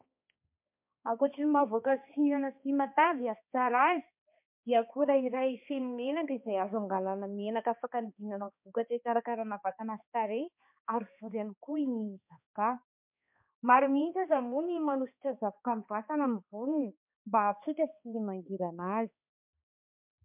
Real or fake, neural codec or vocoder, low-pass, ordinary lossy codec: fake; codec, 16 kHz, 2 kbps, X-Codec, HuBERT features, trained on balanced general audio; 3.6 kHz; MP3, 32 kbps